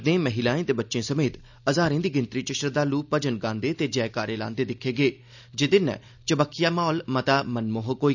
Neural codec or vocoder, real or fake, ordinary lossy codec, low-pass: none; real; none; 7.2 kHz